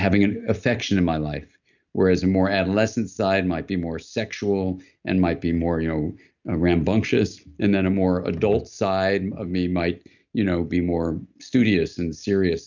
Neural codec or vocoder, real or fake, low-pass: none; real; 7.2 kHz